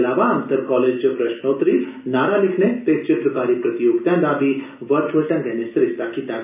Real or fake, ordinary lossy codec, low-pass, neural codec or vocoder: real; none; 3.6 kHz; none